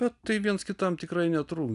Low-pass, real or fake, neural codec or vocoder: 10.8 kHz; real; none